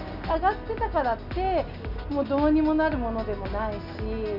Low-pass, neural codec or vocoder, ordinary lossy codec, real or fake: 5.4 kHz; none; none; real